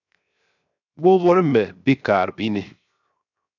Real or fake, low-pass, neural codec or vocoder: fake; 7.2 kHz; codec, 16 kHz, 0.7 kbps, FocalCodec